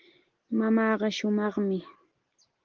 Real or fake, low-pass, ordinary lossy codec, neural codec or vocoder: real; 7.2 kHz; Opus, 16 kbps; none